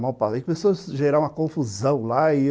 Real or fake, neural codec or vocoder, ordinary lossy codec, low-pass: real; none; none; none